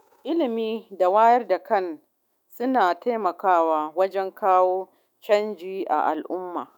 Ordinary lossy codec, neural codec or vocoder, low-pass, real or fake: none; autoencoder, 48 kHz, 128 numbers a frame, DAC-VAE, trained on Japanese speech; 19.8 kHz; fake